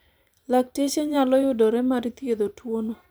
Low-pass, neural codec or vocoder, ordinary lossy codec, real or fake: none; vocoder, 44.1 kHz, 128 mel bands every 512 samples, BigVGAN v2; none; fake